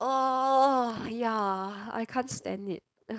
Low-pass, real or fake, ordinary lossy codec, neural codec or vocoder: none; fake; none; codec, 16 kHz, 4.8 kbps, FACodec